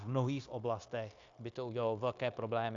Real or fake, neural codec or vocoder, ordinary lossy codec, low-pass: fake; codec, 16 kHz, 0.9 kbps, LongCat-Audio-Codec; AAC, 64 kbps; 7.2 kHz